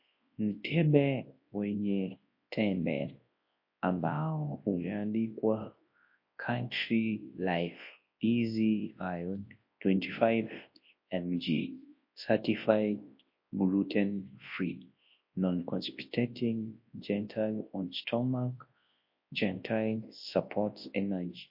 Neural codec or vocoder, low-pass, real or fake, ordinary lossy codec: codec, 24 kHz, 0.9 kbps, WavTokenizer, large speech release; 5.4 kHz; fake; MP3, 32 kbps